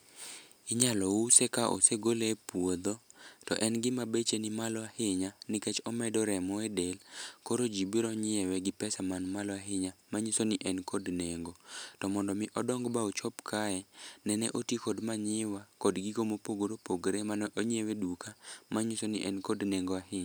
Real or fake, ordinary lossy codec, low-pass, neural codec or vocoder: real; none; none; none